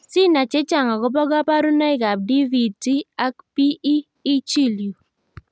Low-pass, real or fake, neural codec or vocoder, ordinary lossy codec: none; real; none; none